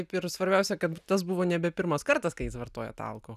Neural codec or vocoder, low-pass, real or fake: none; 14.4 kHz; real